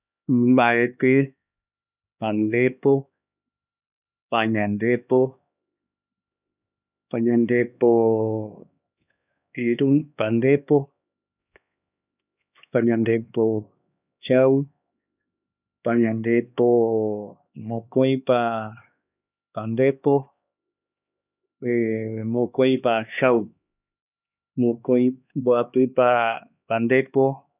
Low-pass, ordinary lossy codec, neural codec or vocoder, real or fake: 3.6 kHz; none; codec, 16 kHz, 2 kbps, X-Codec, HuBERT features, trained on LibriSpeech; fake